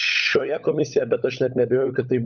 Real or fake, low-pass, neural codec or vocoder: fake; 7.2 kHz; codec, 16 kHz, 16 kbps, FunCodec, trained on LibriTTS, 50 frames a second